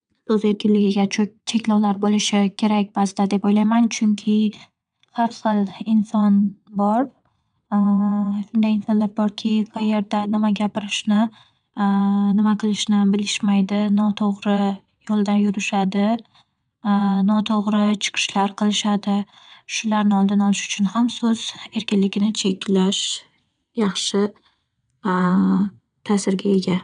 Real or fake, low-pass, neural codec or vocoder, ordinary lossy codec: fake; 9.9 kHz; vocoder, 22.05 kHz, 80 mel bands, WaveNeXt; none